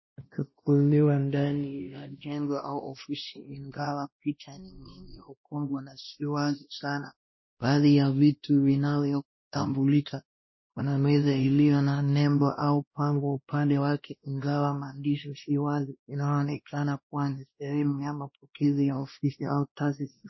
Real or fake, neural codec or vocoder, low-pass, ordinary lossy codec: fake; codec, 16 kHz, 1 kbps, X-Codec, WavLM features, trained on Multilingual LibriSpeech; 7.2 kHz; MP3, 24 kbps